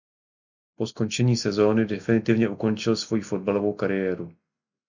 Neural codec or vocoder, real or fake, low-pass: none; real; 7.2 kHz